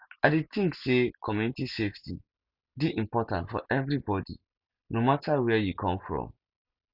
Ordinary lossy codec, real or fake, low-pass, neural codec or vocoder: Opus, 64 kbps; real; 5.4 kHz; none